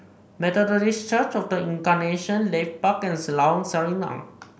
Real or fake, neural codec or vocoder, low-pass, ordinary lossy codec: real; none; none; none